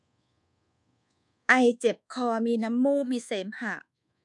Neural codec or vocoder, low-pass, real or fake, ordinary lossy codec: codec, 24 kHz, 1.2 kbps, DualCodec; 10.8 kHz; fake; none